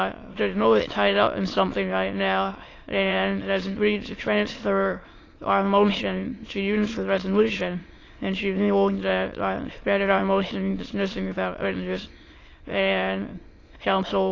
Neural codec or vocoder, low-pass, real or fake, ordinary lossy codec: autoencoder, 22.05 kHz, a latent of 192 numbers a frame, VITS, trained on many speakers; 7.2 kHz; fake; AAC, 32 kbps